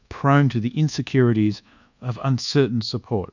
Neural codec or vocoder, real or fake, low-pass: codec, 24 kHz, 1.2 kbps, DualCodec; fake; 7.2 kHz